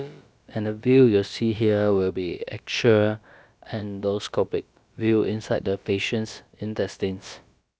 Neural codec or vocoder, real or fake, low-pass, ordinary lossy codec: codec, 16 kHz, about 1 kbps, DyCAST, with the encoder's durations; fake; none; none